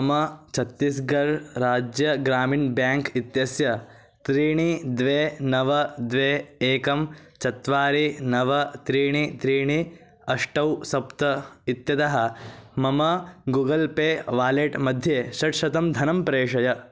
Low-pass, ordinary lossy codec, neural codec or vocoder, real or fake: none; none; none; real